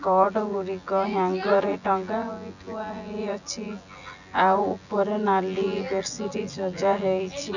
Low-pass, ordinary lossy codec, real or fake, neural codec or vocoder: 7.2 kHz; none; fake; vocoder, 24 kHz, 100 mel bands, Vocos